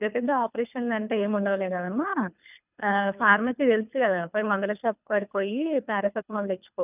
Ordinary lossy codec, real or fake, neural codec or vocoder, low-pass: none; fake; codec, 24 kHz, 3 kbps, HILCodec; 3.6 kHz